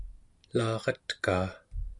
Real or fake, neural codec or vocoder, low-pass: real; none; 10.8 kHz